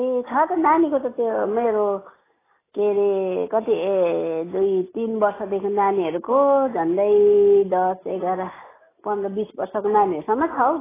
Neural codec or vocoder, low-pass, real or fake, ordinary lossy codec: none; 3.6 kHz; real; AAC, 16 kbps